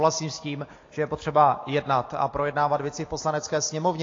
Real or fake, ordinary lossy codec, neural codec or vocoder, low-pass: real; AAC, 32 kbps; none; 7.2 kHz